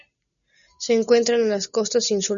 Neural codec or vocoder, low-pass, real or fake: none; 7.2 kHz; real